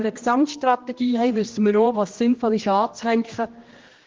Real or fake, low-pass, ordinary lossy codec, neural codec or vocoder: fake; 7.2 kHz; Opus, 16 kbps; codec, 16 kHz, 1 kbps, X-Codec, HuBERT features, trained on general audio